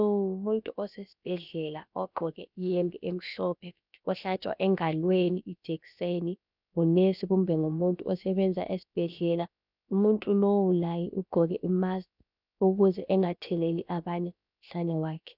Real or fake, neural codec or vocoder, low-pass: fake; codec, 16 kHz, about 1 kbps, DyCAST, with the encoder's durations; 5.4 kHz